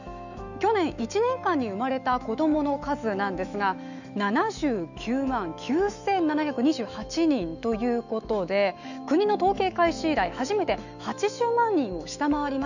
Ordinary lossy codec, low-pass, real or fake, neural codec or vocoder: none; 7.2 kHz; fake; autoencoder, 48 kHz, 128 numbers a frame, DAC-VAE, trained on Japanese speech